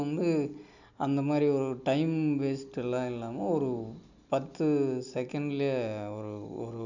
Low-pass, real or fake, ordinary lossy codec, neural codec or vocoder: 7.2 kHz; real; none; none